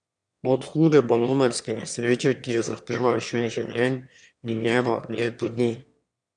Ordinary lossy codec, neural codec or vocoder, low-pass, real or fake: none; autoencoder, 22.05 kHz, a latent of 192 numbers a frame, VITS, trained on one speaker; 9.9 kHz; fake